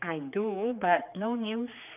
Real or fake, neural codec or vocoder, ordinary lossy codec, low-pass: fake; codec, 16 kHz, 4 kbps, X-Codec, HuBERT features, trained on general audio; none; 3.6 kHz